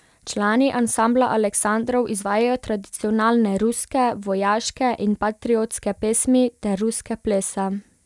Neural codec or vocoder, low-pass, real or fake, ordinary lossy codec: none; none; real; none